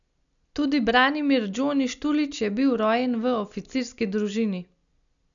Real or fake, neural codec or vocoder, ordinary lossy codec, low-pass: real; none; none; 7.2 kHz